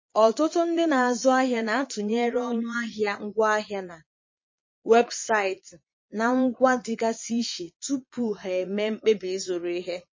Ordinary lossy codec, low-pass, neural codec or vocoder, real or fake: MP3, 32 kbps; 7.2 kHz; vocoder, 22.05 kHz, 80 mel bands, Vocos; fake